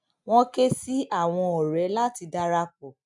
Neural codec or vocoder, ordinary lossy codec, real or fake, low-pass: none; none; real; 10.8 kHz